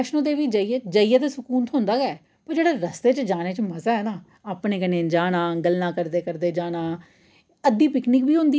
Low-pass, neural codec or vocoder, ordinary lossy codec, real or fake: none; none; none; real